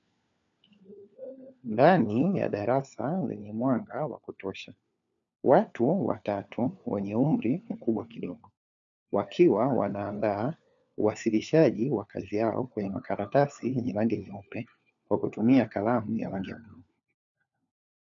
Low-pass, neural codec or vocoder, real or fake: 7.2 kHz; codec, 16 kHz, 4 kbps, FunCodec, trained on LibriTTS, 50 frames a second; fake